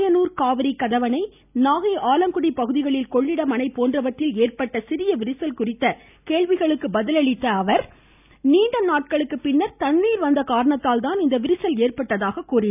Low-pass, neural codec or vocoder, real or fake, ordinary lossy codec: 3.6 kHz; none; real; none